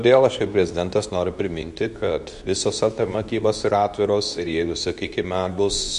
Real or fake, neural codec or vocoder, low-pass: fake; codec, 24 kHz, 0.9 kbps, WavTokenizer, medium speech release version 2; 10.8 kHz